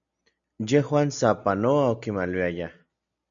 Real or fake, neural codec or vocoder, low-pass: real; none; 7.2 kHz